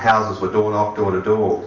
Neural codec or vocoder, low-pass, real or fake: none; 7.2 kHz; real